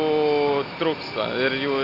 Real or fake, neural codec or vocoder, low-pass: real; none; 5.4 kHz